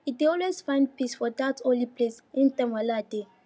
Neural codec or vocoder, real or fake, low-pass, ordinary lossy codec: none; real; none; none